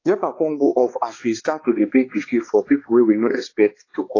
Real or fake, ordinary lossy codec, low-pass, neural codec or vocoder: fake; AAC, 32 kbps; 7.2 kHz; codec, 16 kHz, 2 kbps, X-Codec, HuBERT features, trained on balanced general audio